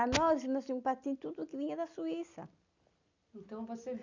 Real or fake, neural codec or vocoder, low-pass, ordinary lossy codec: real; none; 7.2 kHz; none